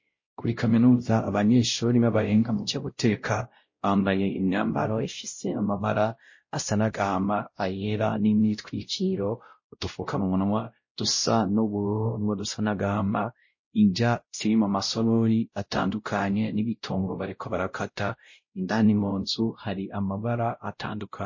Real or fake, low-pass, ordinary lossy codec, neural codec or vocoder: fake; 7.2 kHz; MP3, 32 kbps; codec, 16 kHz, 0.5 kbps, X-Codec, WavLM features, trained on Multilingual LibriSpeech